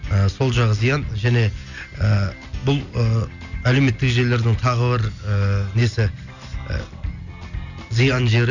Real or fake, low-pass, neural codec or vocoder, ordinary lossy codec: real; 7.2 kHz; none; none